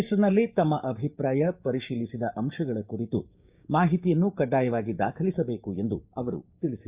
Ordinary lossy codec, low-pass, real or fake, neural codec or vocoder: Opus, 64 kbps; 3.6 kHz; fake; codec, 16 kHz, 6 kbps, DAC